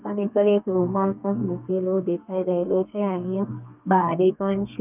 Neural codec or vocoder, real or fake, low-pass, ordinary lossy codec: codec, 32 kHz, 1.9 kbps, SNAC; fake; 3.6 kHz; none